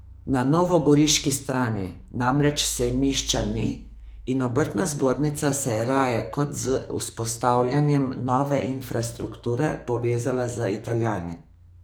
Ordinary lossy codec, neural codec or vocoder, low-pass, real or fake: none; codec, 44.1 kHz, 2.6 kbps, SNAC; none; fake